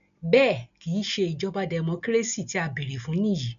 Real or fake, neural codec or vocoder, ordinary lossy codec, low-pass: real; none; none; 7.2 kHz